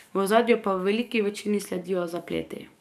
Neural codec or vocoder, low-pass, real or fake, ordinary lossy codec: codec, 44.1 kHz, 7.8 kbps, DAC; 14.4 kHz; fake; none